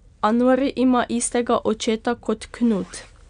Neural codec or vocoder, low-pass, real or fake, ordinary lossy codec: none; 9.9 kHz; real; none